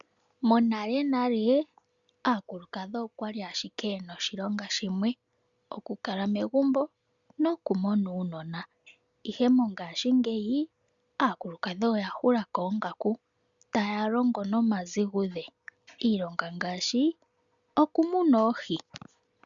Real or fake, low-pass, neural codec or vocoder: real; 7.2 kHz; none